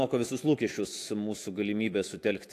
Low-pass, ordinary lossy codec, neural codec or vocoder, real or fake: 14.4 kHz; AAC, 64 kbps; autoencoder, 48 kHz, 128 numbers a frame, DAC-VAE, trained on Japanese speech; fake